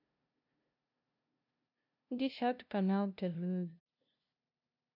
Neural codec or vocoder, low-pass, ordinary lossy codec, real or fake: codec, 16 kHz, 0.5 kbps, FunCodec, trained on LibriTTS, 25 frames a second; 5.4 kHz; none; fake